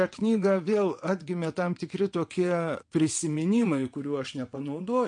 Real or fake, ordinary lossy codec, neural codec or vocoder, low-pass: fake; MP3, 48 kbps; vocoder, 22.05 kHz, 80 mel bands, WaveNeXt; 9.9 kHz